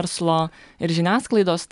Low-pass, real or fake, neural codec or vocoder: 10.8 kHz; real; none